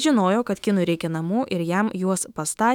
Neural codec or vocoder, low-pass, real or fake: autoencoder, 48 kHz, 128 numbers a frame, DAC-VAE, trained on Japanese speech; 19.8 kHz; fake